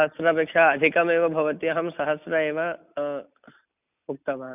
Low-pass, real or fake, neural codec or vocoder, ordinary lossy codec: 3.6 kHz; real; none; none